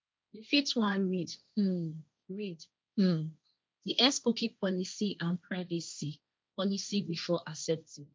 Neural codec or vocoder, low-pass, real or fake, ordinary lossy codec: codec, 16 kHz, 1.1 kbps, Voila-Tokenizer; none; fake; none